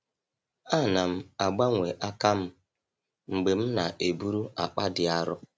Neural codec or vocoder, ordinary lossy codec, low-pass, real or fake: none; none; none; real